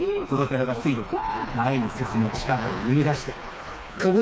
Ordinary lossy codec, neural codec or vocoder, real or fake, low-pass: none; codec, 16 kHz, 2 kbps, FreqCodec, smaller model; fake; none